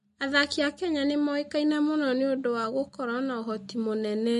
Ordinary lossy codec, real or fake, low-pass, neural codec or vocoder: MP3, 48 kbps; real; 14.4 kHz; none